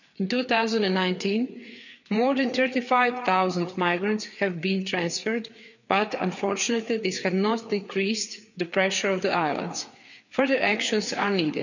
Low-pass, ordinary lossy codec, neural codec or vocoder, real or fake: 7.2 kHz; none; codec, 16 kHz, 4 kbps, FreqCodec, larger model; fake